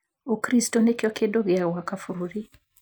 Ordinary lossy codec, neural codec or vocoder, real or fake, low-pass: none; none; real; none